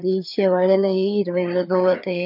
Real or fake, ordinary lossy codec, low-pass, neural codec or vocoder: fake; none; 5.4 kHz; codec, 16 kHz, 4 kbps, FreqCodec, larger model